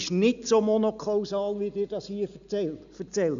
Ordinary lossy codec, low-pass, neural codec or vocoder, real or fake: none; 7.2 kHz; none; real